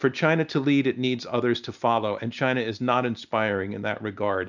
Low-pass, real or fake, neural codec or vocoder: 7.2 kHz; real; none